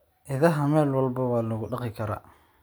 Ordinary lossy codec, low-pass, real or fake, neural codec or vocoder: none; none; real; none